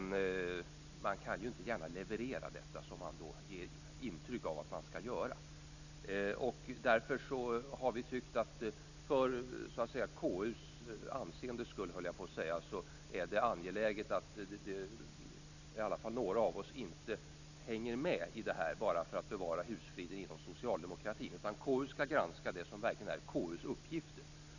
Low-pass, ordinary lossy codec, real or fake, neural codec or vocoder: 7.2 kHz; none; real; none